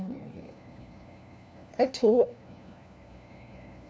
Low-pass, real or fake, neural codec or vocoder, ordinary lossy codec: none; fake; codec, 16 kHz, 1 kbps, FunCodec, trained on LibriTTS, 50 frames a second; none